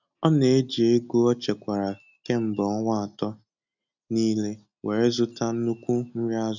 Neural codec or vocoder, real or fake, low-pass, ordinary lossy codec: none; real; 7.2 kHz; none